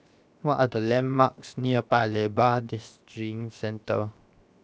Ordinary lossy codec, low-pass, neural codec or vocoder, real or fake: none; none; codec, 16 kHz, 0.7 kbps, FocalCodec; fake